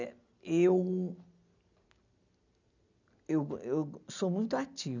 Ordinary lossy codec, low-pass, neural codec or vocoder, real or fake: none; 7.2 kHz; none; real